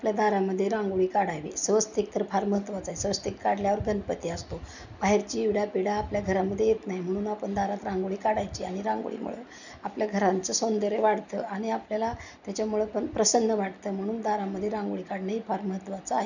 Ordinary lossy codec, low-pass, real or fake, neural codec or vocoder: none; 7.2 kHz; real; none